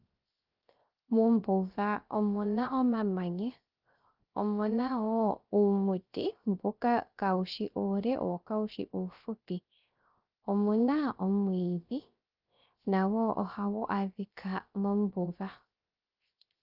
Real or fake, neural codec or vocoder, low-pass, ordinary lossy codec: fake; codec, 16 kHz, 0.3 kbps, FocalCodec; 5.4 kHz; Opus, 32 kbps